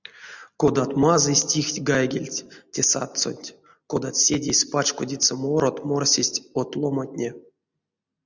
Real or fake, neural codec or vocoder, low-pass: real; none; 7.2 kHz